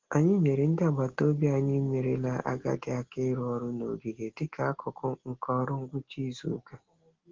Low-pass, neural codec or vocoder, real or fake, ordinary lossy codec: 7.2 kHz; none; real; Opus, 16 kbps